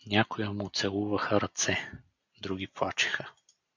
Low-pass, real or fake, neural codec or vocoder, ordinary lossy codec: 7.2 kHz; real; none; MP3, 64 kbps